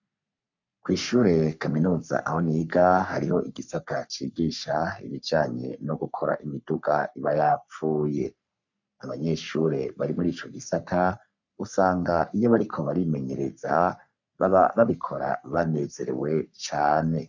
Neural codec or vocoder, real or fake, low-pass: codec, 44.1 kHz, 3.4 kbps, Pupu-Codec; fake; 7.2 kHz